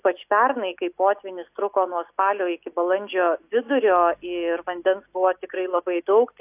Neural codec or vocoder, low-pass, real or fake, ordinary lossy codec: none; 3.6 kHz; real; AAC, 32 kbps